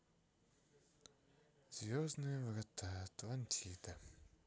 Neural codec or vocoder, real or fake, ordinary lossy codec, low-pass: none; real; none; none